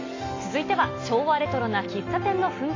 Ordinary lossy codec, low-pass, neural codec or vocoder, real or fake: AAC, 32 kbps; 7.2 kHz; none; real